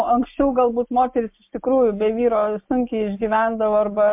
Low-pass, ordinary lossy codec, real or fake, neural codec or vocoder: 3.6 kHz; MP3, 32 kbps; real; none